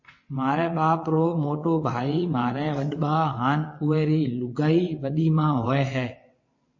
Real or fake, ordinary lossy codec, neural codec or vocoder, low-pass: fake; MP3, 32 kbps; vocoder, 22.05 kHz, 80 mel bands, WaveNeXt; 7.2 kHz